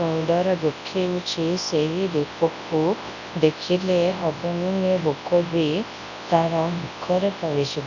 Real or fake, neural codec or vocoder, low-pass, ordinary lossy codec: fake; codec, 24 kHz, 0.9 kbps, WavTokenizer, large speech release; 7.2 kHz; none